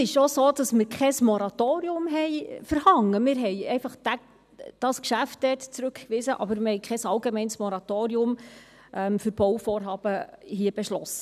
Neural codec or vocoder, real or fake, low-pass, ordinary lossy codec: none; real; 14.4 kHz; none